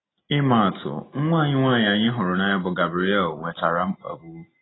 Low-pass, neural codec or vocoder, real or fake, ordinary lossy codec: 7.2 kHz; none; real; AAC, 16 kbps